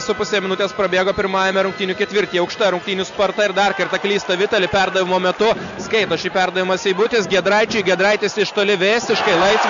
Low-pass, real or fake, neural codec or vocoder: 7.2 kHz; real; none